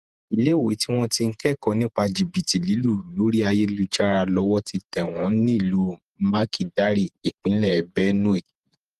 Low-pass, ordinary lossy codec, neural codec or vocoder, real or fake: 14.4 kHz; Opus, 24 kbps; vocoder, 48 kHz, 128 mel bands, Vocos; fake